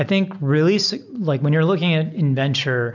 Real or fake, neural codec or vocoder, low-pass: real; none; 7.2 kHz